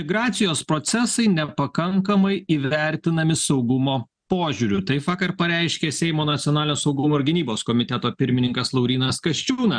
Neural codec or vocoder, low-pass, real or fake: none; 9.9 kHz; real